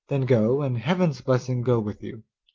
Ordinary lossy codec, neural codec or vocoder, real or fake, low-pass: Opus, 24 kbps; none; real; 7.2 kHz